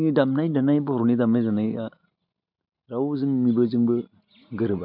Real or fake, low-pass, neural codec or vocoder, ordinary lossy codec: real; 5.4 kHz; none; none